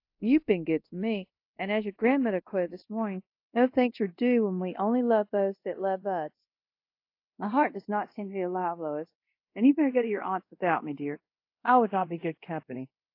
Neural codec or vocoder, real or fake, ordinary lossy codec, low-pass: codec, 24 kHz, 0.5 kbps, DualCodec; fake; AAC, 32 kbps; 5.4 kHz